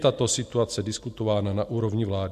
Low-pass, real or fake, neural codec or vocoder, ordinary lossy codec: 14.4 kHz; real; none; MP3, 64 kbps